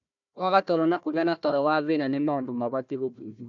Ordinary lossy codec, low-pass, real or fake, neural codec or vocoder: none; 7.2 kHz; fake; codec, 16 kHz, 1 kbps, FunCodec, trained on Chinese and English, 50 frames a second